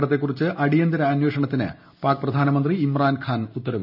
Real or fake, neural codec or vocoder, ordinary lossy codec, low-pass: real; none; none; 5.4 kHz